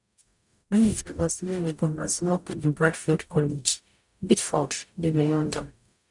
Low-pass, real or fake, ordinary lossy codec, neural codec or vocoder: 10.8 kHz; fake; none; codec, 44.1 kHz, 0.9 kbps, DAC